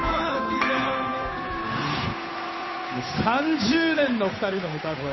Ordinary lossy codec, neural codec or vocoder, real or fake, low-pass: MP3, 24 kbps; codec, 16 kHz, 8 kbps, FunCodec, trained on Chinese and English, 25 frames a second; fake; 7.2 kHz